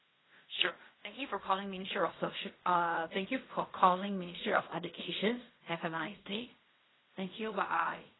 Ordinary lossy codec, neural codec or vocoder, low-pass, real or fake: AAC, 16 kbps; codec, 16 kHz in and 24 kHz out, 0.4 kbps, LongCat-Audio-Codec, fine tuned four codebook decoder; 7.2 kHz; fake